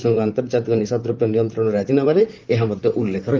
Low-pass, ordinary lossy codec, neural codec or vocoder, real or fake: 7.2 kHz; Opus, 32 kbps; vocoder, 44.1 kHz, 128 mel bands, Pupu-Vocoder; fake